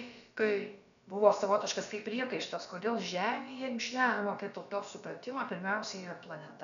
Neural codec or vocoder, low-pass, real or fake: codec, 16 kHz, about 1 kbps, DyCAST, with the encoder's durations; 7.2 kHz; fake